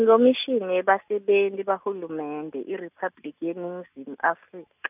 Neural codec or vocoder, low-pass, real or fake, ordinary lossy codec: none; 3.6 kHz; real; none